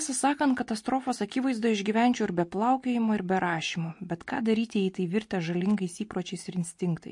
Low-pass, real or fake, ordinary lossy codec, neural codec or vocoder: 10.8 kHz; real; MP3, 48 kbps; none